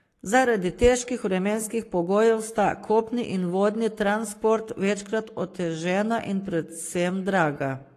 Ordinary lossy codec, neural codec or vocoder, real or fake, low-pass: AAC, 48 kbps; codec, 44.1 kHz, 7.8 kbps, Pupu-Codec; fake; 14.4 kHz